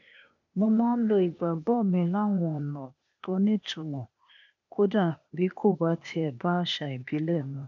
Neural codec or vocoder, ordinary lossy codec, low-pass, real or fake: codec, 16 kHz, 0.8 kbps, ZipCodec; none; 7.2 kHz; fake